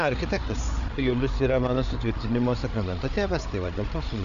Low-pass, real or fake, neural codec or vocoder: 7.2 kHz; fake; codec, 16 kHz, 8 kbps, FreqCodec, larger model